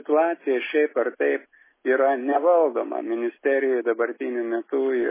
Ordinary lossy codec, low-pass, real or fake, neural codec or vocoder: MP3, 16 kbps; 3.6 kHz; real; none